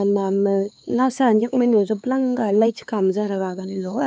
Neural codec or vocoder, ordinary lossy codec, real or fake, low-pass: codec, 16 kHz, 4 kbps, X-Codec, HuBERT features, trained on LibriSpeech; none; fake; none